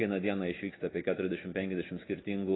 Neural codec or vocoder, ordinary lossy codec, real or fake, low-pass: none; AAC, 16 kbps; real; 7.2 kHz